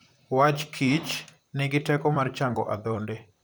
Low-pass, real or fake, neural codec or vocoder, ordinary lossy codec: none; fake; vocoder, 44.1 kHz, 128 mel bands, Pupu-Vocoder; none